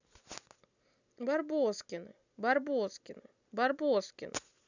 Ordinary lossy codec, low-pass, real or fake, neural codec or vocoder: none; 7.2 kHz; real; none